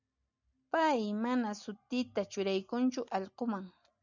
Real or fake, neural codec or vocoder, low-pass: real; none; 7.2 kHz